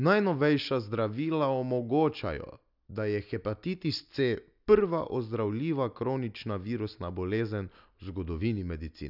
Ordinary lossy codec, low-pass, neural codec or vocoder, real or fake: none; 5.4 kHz; none; real